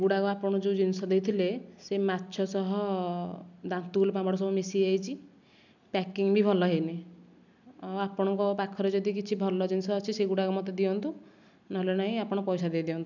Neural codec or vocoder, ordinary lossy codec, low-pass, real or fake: none; none; 7.2 kHz; real